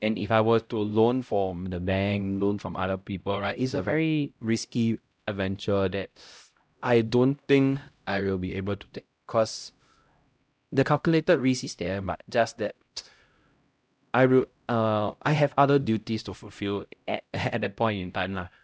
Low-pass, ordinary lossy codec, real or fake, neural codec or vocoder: none; none; fake; codec, 16 kHz, 0.5 kbps, X-Codec, HuBERT features, trained on LibriSpeech